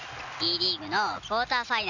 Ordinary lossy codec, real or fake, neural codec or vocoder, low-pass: none; real; none; 7.2 kHz